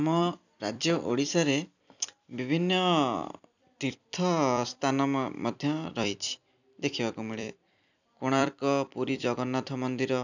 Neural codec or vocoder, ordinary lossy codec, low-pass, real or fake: vocoder, 44.1 kHz, 128 mel bands every 256 samples, BigVGAN v2; none; 7.2 kHz; fake